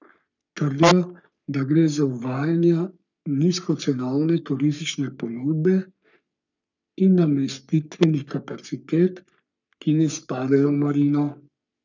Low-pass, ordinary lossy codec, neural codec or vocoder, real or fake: 7.2 kHz; none; codec, 44.1 kHz, 3.4 kbps, Pupu-Codec; fake